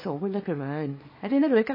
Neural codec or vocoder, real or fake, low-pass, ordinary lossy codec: codec, 24 kHz, 0.9 kbps, WavTokenizer, small release; fake; 5.4 kHz; MP3, 24 kbps